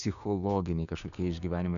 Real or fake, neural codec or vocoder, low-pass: fake; codec, 16 kHz, 6 kbps, DAC; 7.2 kHz